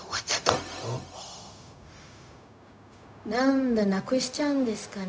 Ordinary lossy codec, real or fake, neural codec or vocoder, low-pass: none; fake; codec, 16 kHz, 0.4 kbps, LongCat-Audio-Codec; none